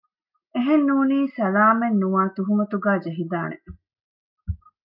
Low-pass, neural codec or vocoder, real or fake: 5.4 kHz; none; real